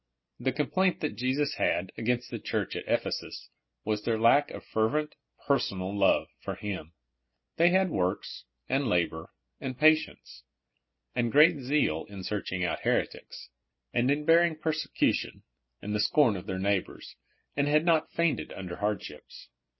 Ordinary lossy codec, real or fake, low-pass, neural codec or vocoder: MP3, 24 kbps; real; 7.2 kHz; none